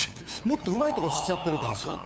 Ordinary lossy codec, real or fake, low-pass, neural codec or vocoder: none; fake; none; codec, 16 kHz, 8 kbps, FunCodec, trained on LibriTTS, 25 frames a second